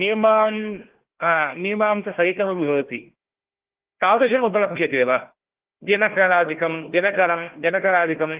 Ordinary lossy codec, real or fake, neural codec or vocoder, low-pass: Opus, 16 kbps; fake; codec, 16 kHz, 1 kbps, FunCodec, trained on Chinese and English, 50 frames a second; 3.6 kHz